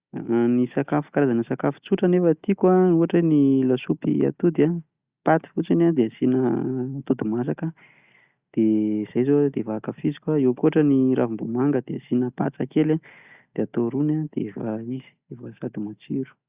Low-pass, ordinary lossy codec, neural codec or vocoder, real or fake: 3.6 kHz; Opus, 64 kbps; none; real